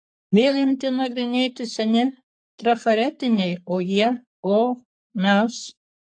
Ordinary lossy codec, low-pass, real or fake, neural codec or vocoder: AAC, 64 kbps; 9.9 kHz; fake; codec, 44.1 kHz, 3.4 kbps, Pupu-Codec